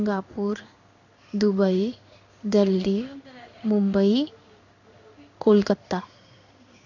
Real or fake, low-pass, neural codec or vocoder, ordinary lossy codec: fake; 7.2 kHz; codec, 16 kHz in and 24 kHz out, 1 kbps, XY-Tokenizer; none